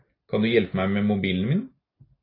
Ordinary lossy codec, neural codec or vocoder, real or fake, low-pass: AAC, 24 kbps; none; real; 5.4 kHz